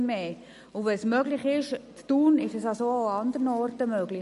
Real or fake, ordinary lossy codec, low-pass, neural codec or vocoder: real; MP3, 48 kbps; 14.4 kHz; none